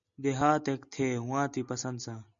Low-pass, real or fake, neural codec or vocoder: 7.2 kHz; real; none